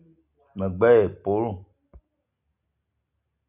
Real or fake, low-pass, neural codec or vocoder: real; 3.6 kHz; none